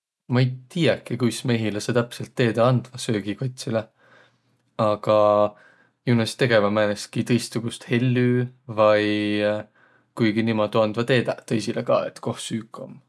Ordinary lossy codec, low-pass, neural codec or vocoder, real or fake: none; none; none; real